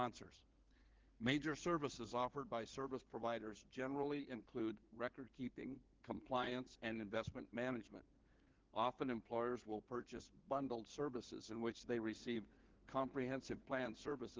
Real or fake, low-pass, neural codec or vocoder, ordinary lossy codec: fake; 7.2 kHz; codec, 16 kHz in and 24 kHz out, 2.2 kbps, FireRedTTS-2 codec; Opus, 16 kbps